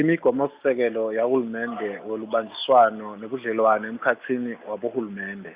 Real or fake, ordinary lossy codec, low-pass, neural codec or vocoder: real; Opus, 64 kbps; 3.6 kHz; none